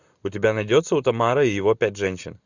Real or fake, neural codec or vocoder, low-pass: real; none; 7.2 kHz